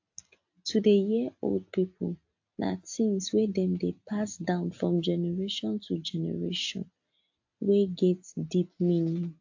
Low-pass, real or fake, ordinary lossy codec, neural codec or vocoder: 7.2 kHz; real; AAC, 48 kbps; none